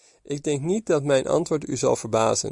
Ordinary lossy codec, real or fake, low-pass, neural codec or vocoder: Opus, 64 kbps; real; 10.8 kHz; none